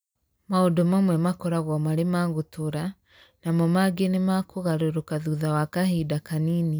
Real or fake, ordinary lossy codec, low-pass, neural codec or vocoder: real; none; none; none